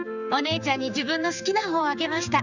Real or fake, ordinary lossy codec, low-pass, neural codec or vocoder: fake; none; 7.2 kHz; codec, 16 kHz, 4 kbps, X-Codec, HuBERT features, trained on general audio